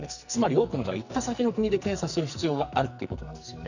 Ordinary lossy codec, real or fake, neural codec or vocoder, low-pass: none; fake; codec, 44.1 kHz, 2.6 kbps, SNAC; 7.2 kHz